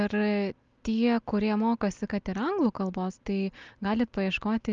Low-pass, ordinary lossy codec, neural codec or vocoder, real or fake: 7.2 kHz; Opus, 32 kbps; none; real